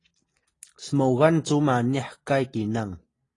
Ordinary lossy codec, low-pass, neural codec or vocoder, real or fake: AAC, 32 kbps; 10.8 kHz; none; real